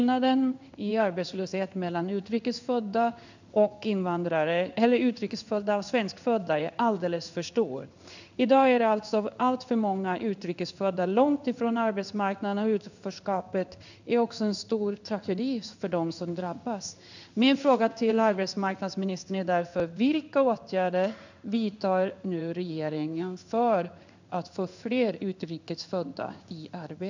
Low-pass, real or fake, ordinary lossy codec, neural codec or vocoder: 7.2 kHz; fake; none; codec, 16 kHz in and 24 kHz out, 1 kbps, XY-Tokenizer